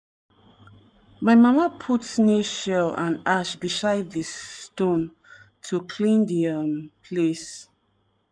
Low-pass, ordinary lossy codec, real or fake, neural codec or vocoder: 9.9 kHz; none; fake; codec, 44.1 kHz, 7.8 kbps, Pupu-Codec